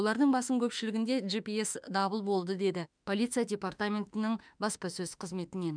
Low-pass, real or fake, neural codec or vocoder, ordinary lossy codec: 9.9 kHz; fake; autoencoder, 48 kHz, 32 numbers a frame, DAC-VAE, trained on Japanese speech; none